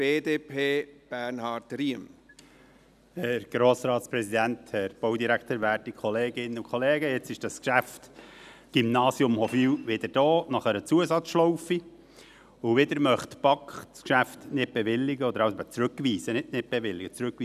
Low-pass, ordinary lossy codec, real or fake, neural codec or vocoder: 14.4 kHz; none; real; none